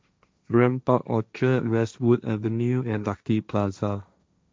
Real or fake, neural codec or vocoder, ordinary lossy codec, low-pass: fake; codec, 16 kHz, 1.1 kbps, Voila-Tokenizer; none; none